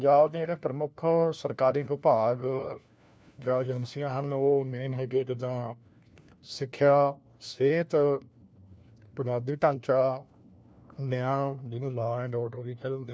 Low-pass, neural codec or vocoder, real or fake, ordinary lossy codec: none; codec, 16 kHz, 1 kbps, FunCodec, trained on LibriTTS, 50 frames a second; fake; none